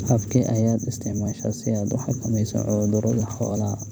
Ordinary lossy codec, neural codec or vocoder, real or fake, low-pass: none; vocoder, 44.1 kHz, 128 mel bands every 256 samples, BigVGAN v2; fake; none